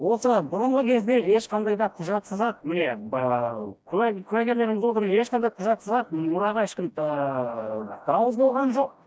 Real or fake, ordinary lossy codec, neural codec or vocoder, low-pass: fake; none; codec, 16 kHz, 1 kbps, FreqCodec, smaller model; none